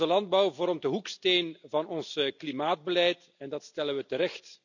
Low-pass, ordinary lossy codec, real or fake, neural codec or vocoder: 7.2 kHz; none; real; none